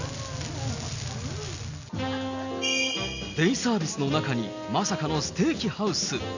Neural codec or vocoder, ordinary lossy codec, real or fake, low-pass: none; none; real; 7.2 kHz